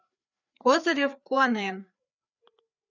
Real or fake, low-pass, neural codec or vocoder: fake; 7.2 kHz; codec, 16 kHz, 4 kbps, FreqCodec, larger model